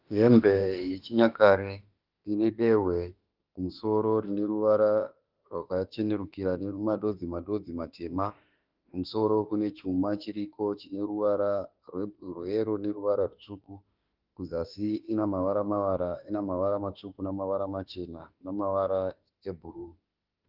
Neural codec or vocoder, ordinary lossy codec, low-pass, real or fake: autoencoder, 48 kHz, 32 numbers a frame, DAC-VAE, trained on Japanese speech; Opus, 32 kbps; 5.4 kHz; fake